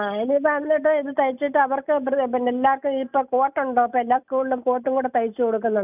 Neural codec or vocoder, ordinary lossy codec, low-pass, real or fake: none; none; 3.6 kHz; real